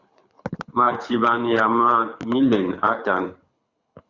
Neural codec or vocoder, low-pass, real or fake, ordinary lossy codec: codec, 24 kHz, 6 kbps, HILCodec; 7.2 kHz; fake; Opus, 64 kbps